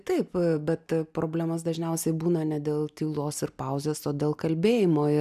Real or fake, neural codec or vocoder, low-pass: real; none; 14.4 kHz